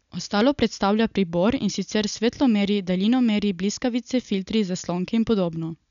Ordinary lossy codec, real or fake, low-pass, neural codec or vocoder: none; real; 7.2 kHz; none